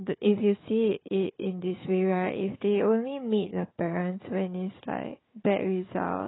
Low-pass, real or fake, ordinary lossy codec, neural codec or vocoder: 7.2 kHz; fake; AAC, 16 kbps; codec, 16 kHz, 4 kbps, FunCodec, trained on Chinese and English, 50 frames a second